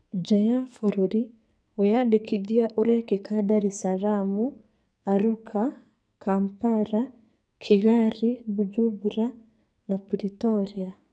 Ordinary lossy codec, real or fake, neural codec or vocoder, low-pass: none; fake; codec, 44.1 kHz, 2.6 kbps, SNAC; 9.9 kHz